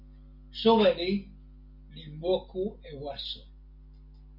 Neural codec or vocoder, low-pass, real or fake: none; 5.4 kHz; real